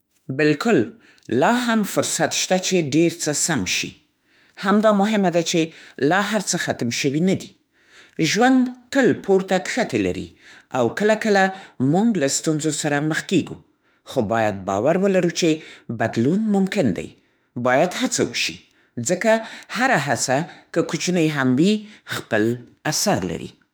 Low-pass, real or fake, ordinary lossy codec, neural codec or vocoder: none; fake; none; autoencoder, 48 kHz, 32 numbers a frame, DAC-VAE, trained on Japanese speech